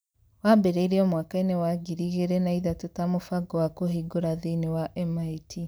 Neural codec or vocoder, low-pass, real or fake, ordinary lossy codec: none; none; real; none